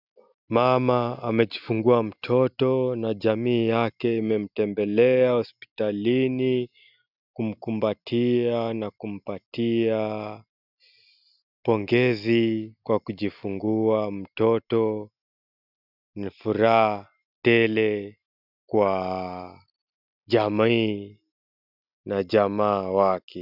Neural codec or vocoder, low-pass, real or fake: none; 5.4 kHz; real